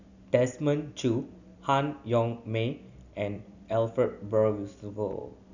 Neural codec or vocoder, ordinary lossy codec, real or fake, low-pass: none; none; real; 7.2 kHz